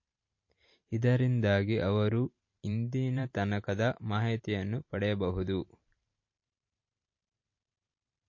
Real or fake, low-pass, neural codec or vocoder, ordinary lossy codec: fake; 7.2 kHz; vocoder, 44.1 kHz, 128 mel bands every 512 samples, BigVGAN v2; MP3, 32 kbps